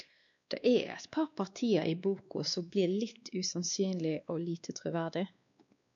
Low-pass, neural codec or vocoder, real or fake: 7.2 kHz; codec, 16 kHz, 2 kbps, X-Codec, WavLM features, trained on Multilingual LibriSpeech; fake